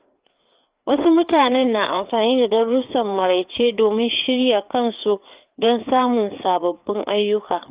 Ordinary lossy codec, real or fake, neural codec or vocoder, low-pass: Opus, 32 kbps; fake; codec, 16 kHz, 4 kbps, FreqCodec, larger model; 3.6 kHz